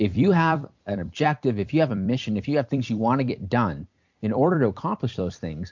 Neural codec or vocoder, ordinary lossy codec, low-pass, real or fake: vocoder, 44.1 kHz, 128 mel bands every 256 samples, BigVGAN v2; MP3, 48 kbps; 7.2 kHz; fake